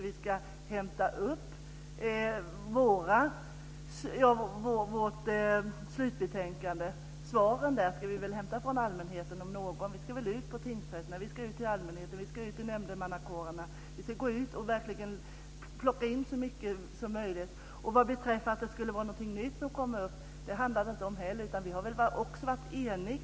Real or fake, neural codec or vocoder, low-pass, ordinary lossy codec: real; none; none; none